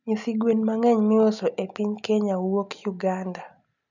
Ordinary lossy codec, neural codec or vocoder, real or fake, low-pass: none; none; real; 7.2 kHz